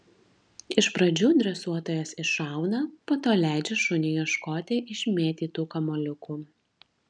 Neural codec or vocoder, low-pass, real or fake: none; 9.9 kHz; real